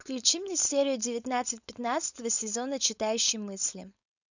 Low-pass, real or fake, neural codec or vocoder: 7.2 kHz; fake; codec, 16 kHz, 4.8 kbps, FACodec